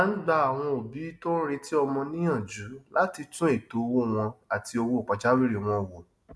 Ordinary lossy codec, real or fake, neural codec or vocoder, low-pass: none; real; none; none